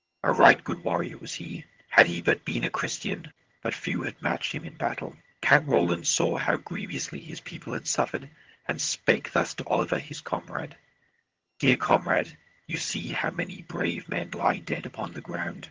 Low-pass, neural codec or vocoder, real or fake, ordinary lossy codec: 7.2 kHz; vocoder, 22.05 kHz, 80 mel bands, HiFi-GAN; fake; Opus, 16 kbps